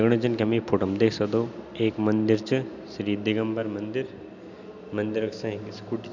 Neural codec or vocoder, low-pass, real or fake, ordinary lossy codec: none; 7.2 kHz; real; none